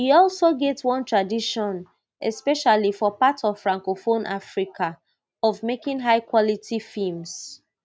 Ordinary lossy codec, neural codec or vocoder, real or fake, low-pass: none; none; real; none